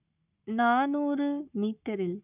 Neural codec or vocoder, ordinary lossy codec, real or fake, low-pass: codec, 44.1 kHz, 7.8 kbps, Pupu-Codec; none; fake; 3.6 kHz